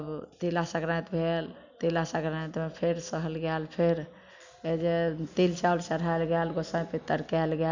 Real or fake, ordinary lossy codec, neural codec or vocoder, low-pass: real; none; none; 7.2 kHz